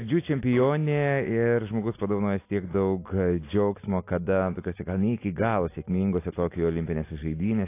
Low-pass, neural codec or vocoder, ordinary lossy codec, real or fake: 3.6 kHz; none; AAC, 24 kbps; real